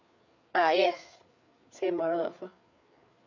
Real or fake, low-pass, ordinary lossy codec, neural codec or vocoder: fake; 7.2 kHz; none; codec, 16 kHz, 4 kbps, FreqCodec, larger model